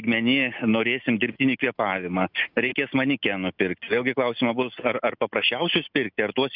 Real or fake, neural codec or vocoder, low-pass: real; none; 5.4 kHz